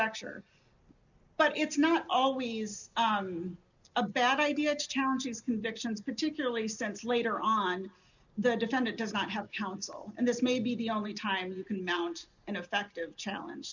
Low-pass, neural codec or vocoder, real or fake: 7.2 kHz; none; real